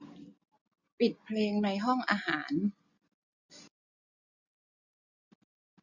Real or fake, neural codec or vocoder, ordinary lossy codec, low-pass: real; none; none; 7.2 kHz